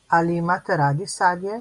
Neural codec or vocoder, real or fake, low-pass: none; real; 10.8 kHz